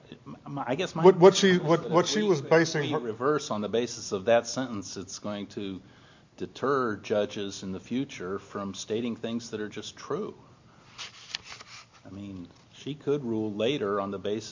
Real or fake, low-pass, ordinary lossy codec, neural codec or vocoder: real; 7.2 kHz; MP3, 48 kbps; none